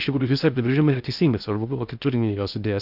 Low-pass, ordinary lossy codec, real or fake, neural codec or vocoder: 5.4 kHz; Opus, 64 kbps; fake; codec, 16 kHz in and 24 kHz out, 0.6 kbps, FocalCodec, streaming, 2048 codes